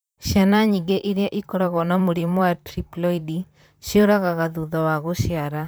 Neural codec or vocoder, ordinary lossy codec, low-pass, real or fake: vocoder, 44.1 kHz, 128 mel bands, Pupu-Vocoder; none; none; fake